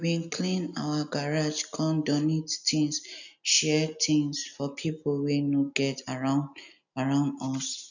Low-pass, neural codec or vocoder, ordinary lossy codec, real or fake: 7.2 kHz; none; none; real